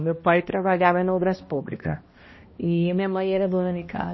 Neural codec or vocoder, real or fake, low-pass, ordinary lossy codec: codec, 16 kHz, 1 kbps, X-Codec, HuBERT features, trained on balanced general audio; fake; 7.2 kHz; MP3, 24 kbps